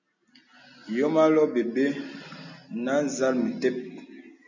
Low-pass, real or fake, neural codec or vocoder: 7.2 kHz; real; none